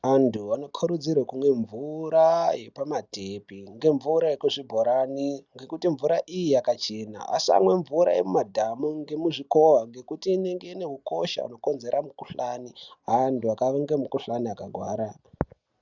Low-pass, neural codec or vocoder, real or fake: 7.2 kHz; none; real